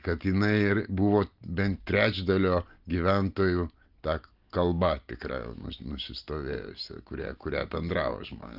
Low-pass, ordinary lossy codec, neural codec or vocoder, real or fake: 5.4 kHz; Opus, 32 kbps; none; real